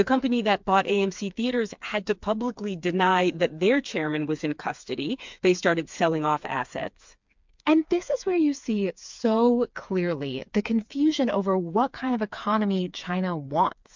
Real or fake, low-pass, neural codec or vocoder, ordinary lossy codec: fake; 7.2 kHz; codec, 16 kHz, 4 kbps, FreqCodec, smaller model; MP3, 64 kbps